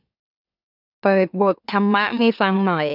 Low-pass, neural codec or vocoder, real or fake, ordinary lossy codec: 5.4 kHz; autoencoder, 44.1 kHz, a latent of 192 numbers a frame, MeloTTS; fake; none